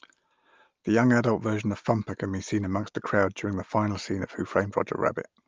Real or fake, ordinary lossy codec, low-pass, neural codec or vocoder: real; Opus, 32 kbps; 7.2 kHz; none